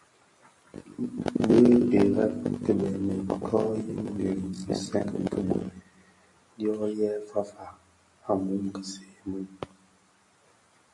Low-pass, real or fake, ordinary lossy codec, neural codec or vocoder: 10.8 kHz; real; MP3, 48 kbps; none